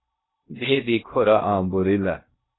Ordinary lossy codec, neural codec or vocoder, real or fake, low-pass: AAC, 16 kbps; codec, 16 kHz in and 24 kHz out, 0.6 kbps, FocalCodec, streaming, 2048 codes; fake; 7.2 kHz